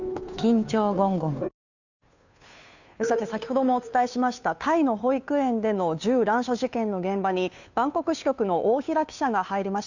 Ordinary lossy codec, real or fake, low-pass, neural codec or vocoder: none; fake; 7.2 kHz; codec, 16 kHz, 2 kbps, FunCodec, trained on Chinese and English, 25 frames a second